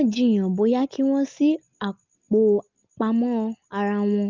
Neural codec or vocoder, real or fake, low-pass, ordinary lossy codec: none; real; 7.2 kHz; Opus, 32 kbps